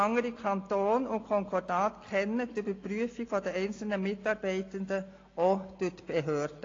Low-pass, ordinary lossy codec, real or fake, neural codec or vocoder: 7.2 kHz; AAC, 32 kbps; real; none